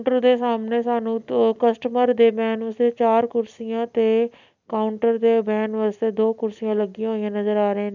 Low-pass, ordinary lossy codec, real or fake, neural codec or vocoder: 7.2 kHz; none; real; none